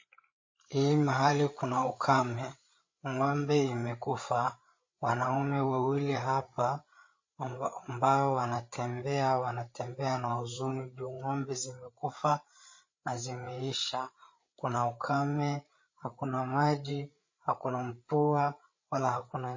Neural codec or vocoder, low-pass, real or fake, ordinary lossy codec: codec, 16 kHz, 8 kbps, FreqCodec, larger model; 7.2 kHz; fake; MP3, 32 kbps